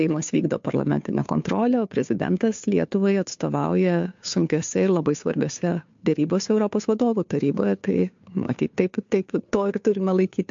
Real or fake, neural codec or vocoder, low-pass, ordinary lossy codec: fake; codec, 16 kHz, 2 kbps, FunCodec, trained on Chinese and English, 25 frames a second; 7.2 kHz; MP3, 48 kbps